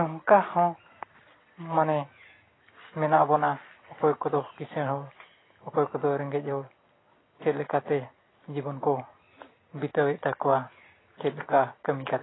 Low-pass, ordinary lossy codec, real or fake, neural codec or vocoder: 7.2 kHz; AAC, 16 kbps; real; none